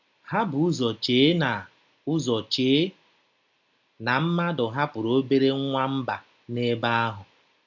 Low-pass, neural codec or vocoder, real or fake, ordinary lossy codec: 7.2 kHz; none; real; none